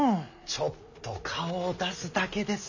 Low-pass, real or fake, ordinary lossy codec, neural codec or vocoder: 7.2 kHz; real; none; none